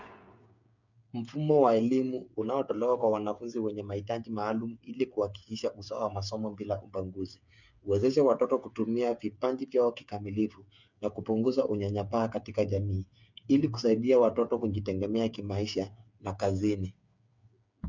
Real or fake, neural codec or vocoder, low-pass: fake; codec, 16 kHz, 8 kbps, FreqCodec, smaller model; 7.2 kHz